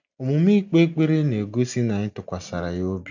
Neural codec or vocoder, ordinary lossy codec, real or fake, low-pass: none; none; real; 7.2 kHz